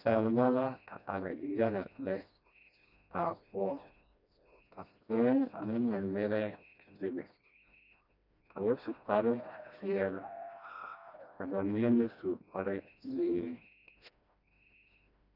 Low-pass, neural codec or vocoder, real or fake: 5.4 kHz; codec, 16 kHz, 1 kbps, FreqCodec, smaller model; fake